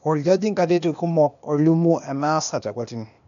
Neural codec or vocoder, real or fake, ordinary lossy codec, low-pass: codec, 16 kHz, 0.8 kbps, ZipCodec; fake; none; 7.2 kHz